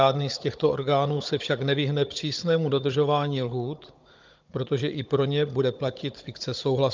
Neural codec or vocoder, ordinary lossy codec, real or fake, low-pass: codec, 16 kHz, 16 kbps, FunCodec, trained on Chinese and English, 50 frames a second; Opus, 24 kbps; fake; 7.2 kHz